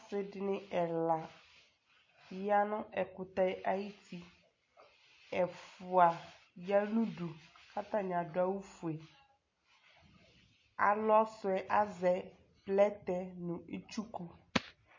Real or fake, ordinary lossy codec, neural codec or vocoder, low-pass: real; MP3, 32 kbps; none; 7.2 kHz